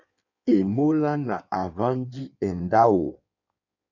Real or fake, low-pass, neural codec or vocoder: fake; 7.2 kHz; codec, 16 kHz in and 24 kHz out, 1.1 kbps, FireRedTTS-2 codec